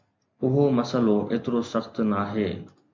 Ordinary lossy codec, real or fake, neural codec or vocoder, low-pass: MP3, 64 kbps; real; none; 7.2 kHz